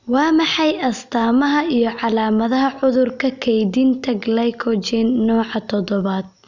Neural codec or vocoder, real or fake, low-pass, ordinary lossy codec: none; real; 7.2 kHz; none